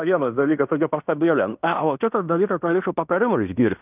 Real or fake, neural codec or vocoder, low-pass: fake; codec, 16 kHz in and 24 kHz out, 0.9 kbps, LongCat-Audio-Codec, fine tuned four codebook decoder; 3.6 kHz